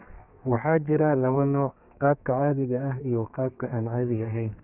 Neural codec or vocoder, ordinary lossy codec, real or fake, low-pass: codec, 32 kHz, 1.9 kbps, SNAC; Opus, 64 kbps; fake; 3.6 kHz